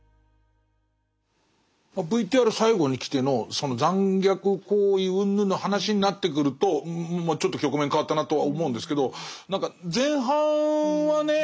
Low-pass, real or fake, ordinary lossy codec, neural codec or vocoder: none; real; none; none